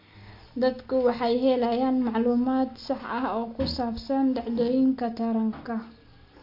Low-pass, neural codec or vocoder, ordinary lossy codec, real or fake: 5.4 kHz; none; MP3, 32 kbps; real